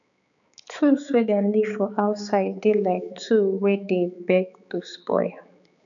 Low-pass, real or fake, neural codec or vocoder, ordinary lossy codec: 7.2 kHz; fake; codec, 16 kHz, 4 kbps, X-Codec, HuBERT features, trained on balanced general audio; none